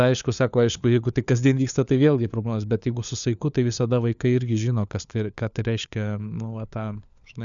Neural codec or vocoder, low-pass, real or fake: codec, 16 kHz, 4 kbps, FunCodec, trained on LibriTTS, 50 frames a second; 7.2 kHz; fake